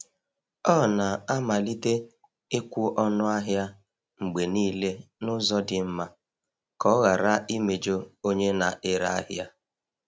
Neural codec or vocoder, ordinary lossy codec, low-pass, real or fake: none; none; none; real